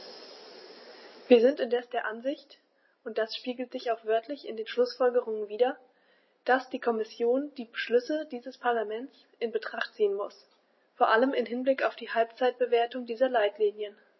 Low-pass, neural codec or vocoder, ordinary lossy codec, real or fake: 7.2 kHz; none; MP3, 24 kbps; real